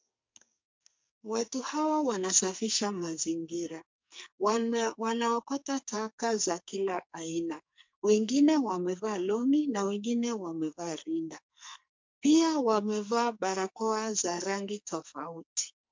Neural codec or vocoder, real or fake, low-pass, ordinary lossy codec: codec, 44.1 kHz, 2.6 kbps, SNAC; fake; 7.2 kHz; MP3, 64 kbps